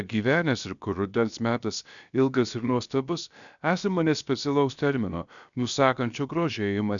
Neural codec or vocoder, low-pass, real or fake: codec, 16 kHz, about 1 kbps, DyCAST, with the encoder's durations; 7.2 kHz; fake